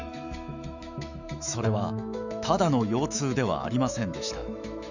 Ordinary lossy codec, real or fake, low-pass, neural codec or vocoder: none; fake; 7.2 kHz; autoencoder, 48 kHz, 128 numbers a frame, DAC-VAE, trained on Japanese speech